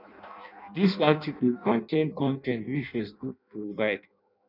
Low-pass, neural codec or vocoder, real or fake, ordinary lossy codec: 5.4 kHz; codec, 16 kHz in and 24 kHz out, 0.6 kbps, FireRedTTS-2 codec; fake; none